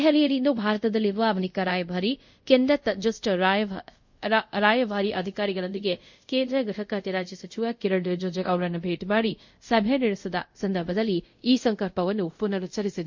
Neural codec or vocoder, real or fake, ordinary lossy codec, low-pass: codec, 24 kHz, 0.5 kbps, DualCodec; fake; MP3, 48 kbps; 7.2 kHz